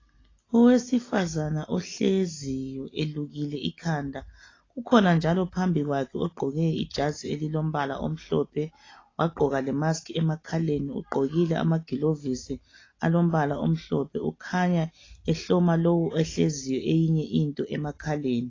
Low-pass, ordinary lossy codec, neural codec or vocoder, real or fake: 7.2 kHz; AAC, 32 kbps; none; real